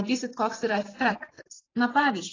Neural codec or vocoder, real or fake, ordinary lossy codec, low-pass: vocoder, 44.1 kHz, 128 mel bands every 512 samples, BigVGAN v2; fake; AAC, 32 kbps; 7.2 kHz